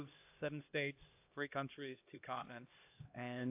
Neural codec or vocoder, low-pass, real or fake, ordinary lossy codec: codec, 16 kHz, 1 kbps, X-Codec, HuBERT features, trained on LibriSpeech; 3.6 kHz; fake; AAC, 32 kbps